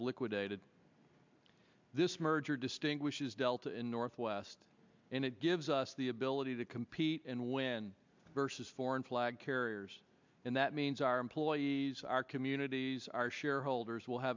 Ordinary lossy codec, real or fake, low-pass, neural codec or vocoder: MP3, 64 kbps; real; 7.2 kHz; none